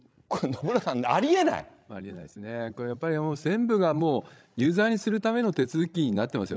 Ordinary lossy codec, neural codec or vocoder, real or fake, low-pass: none; codec, 16 kHz, 16 kbps, FreqCodec, larger model; fake; none